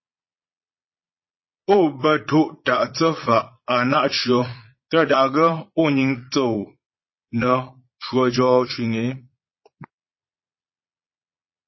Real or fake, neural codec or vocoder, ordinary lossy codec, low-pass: fake; vocoder, 22.05 kHz, 80 mel bands, Vocos; MP3, 24 kbps; 7.2 kHz